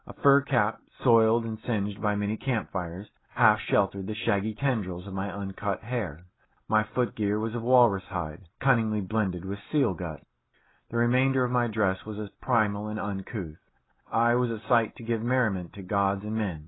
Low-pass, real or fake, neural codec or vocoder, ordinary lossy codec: 7.2 kHz; real; none; AAC, 16 kbps